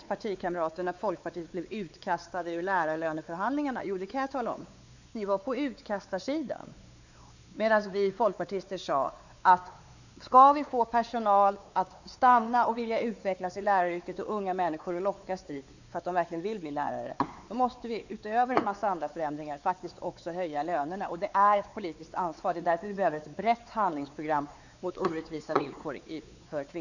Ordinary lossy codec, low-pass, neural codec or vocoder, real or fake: none; 7.2 kHz; codec, 16 kHz, 4 kbps, X-Codec, WavLM features, trained on Multilingual LibriSpeech; fake